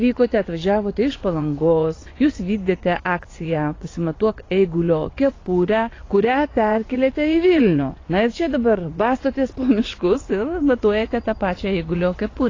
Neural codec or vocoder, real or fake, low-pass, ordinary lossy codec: vocoder, 24 kHz, 100 mel bands, Vocos; fake; 7.2 kHz; AAC, 32 kbps